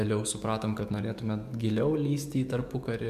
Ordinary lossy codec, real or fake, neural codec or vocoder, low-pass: MP3, 96 kbps; fake; codec, 44.1 kHz, 7.8 kbps, DAC; 14.4 kHz